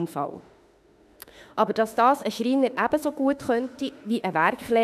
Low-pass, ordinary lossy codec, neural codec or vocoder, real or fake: 14.4 kHz; none; autoencoder, 48 kHz, 32 numbers a frame, DAC-VAE, trained on Japanese speech; fake